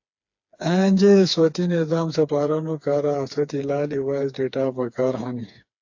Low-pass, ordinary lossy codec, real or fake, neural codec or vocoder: 7.2 kHz; AAC, 48 kbps; fake; codec, 16 kHz, 4 kbps, FreqCodec, smaller model